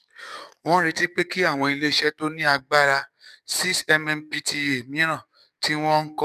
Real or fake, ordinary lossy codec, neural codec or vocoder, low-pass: fake; none; codec, 44.1 kHz, 7.8 kbps, DAC; 14.4 kHz